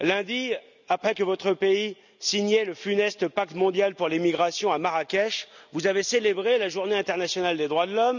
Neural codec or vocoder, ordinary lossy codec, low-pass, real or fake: none; none; 7.2 kHz; real